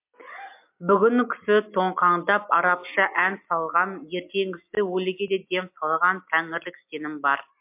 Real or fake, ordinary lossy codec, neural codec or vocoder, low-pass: real; MP3, 32 kbps; none; 3.6 kHz